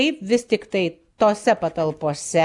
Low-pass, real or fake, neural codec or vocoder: 10.8 kHz; real; none